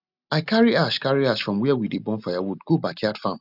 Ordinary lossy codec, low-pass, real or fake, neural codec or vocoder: none; 5.4 kHz; real; none